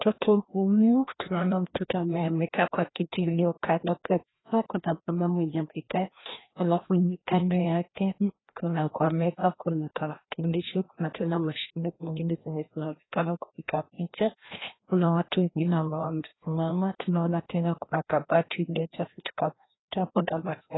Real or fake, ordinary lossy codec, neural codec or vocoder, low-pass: fake; AAC, 16 kbps; codec, 16 kHz, 1 kbps, FreqCodec, larger model; 7.2 kHz